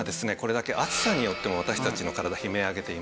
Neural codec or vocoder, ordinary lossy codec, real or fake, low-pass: none; none; real; none